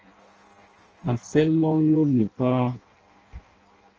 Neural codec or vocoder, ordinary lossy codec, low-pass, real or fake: codec, 16 kHz in and 24 kHz out, 0.6 kbps, FireRedTTS-2 codec; Opus, 24 kbps; 7.2 kHz; fake